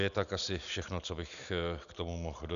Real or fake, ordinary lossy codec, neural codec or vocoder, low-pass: real; MP3, 96 kbps; none; 7.2 kHz